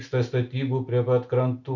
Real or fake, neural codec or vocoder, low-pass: real; none; 7.2 kHz